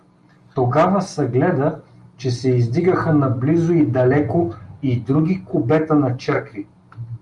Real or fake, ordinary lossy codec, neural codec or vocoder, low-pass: real; Opus, 32 kbps; none; 10.8 kHz